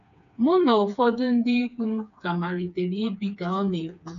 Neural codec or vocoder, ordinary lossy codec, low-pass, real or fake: codec, 16 kHz, 4 kbps, FreqCodec, smaller model; none; 7.2 kHz; fake